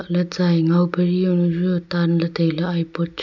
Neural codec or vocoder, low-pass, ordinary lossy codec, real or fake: none; 7.2 kHz; none; real